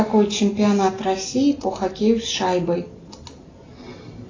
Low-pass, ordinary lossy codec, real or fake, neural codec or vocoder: 7.2 kHz; AAC, 32 kbps; real; none